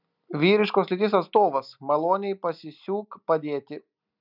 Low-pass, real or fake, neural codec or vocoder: 5.4 kHz; real; none